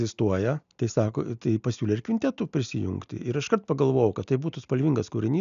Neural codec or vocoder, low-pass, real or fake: none; 7.2 kHz; real